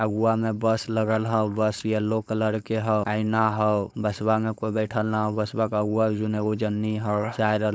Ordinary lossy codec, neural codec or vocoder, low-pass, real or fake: none; codec, 16 kHz, 4.8 kbps, FACodec; none; fake